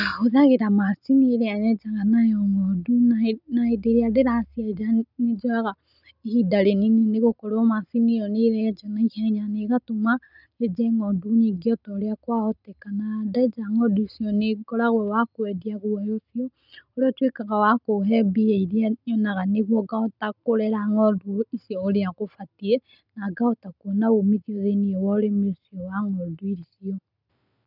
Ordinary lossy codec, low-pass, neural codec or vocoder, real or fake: none; 5.4 kHz; none; real